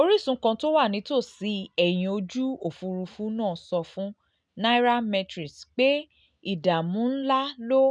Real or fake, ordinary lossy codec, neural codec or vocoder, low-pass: real; none; none; 9.9 kHz